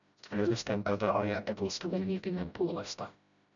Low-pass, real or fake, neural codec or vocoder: 7.2 kHz; fake; codec, 16 kHz, 0.5 kbps, FreqCodec, smaller model